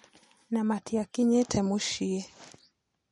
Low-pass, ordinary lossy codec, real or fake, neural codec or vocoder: 19.8 kHz; MP3, 48 kbps; real; none